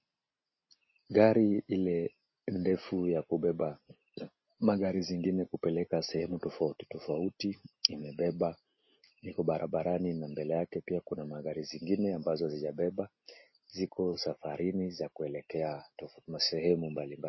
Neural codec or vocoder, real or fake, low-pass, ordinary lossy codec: none; real; 7.2 kHz; MP3, 24 kbps